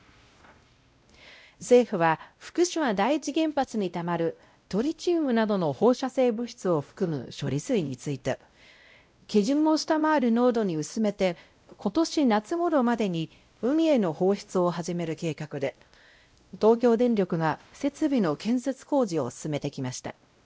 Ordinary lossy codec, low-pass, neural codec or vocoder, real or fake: none; none; codec, 16 kHz, 0.5 kbps, X-Codec, WavLM features, trained on Multilingual LibriSpeech; fake